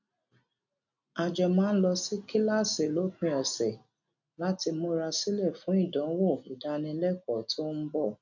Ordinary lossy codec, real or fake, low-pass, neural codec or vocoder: none; real; 7.2 kHz; none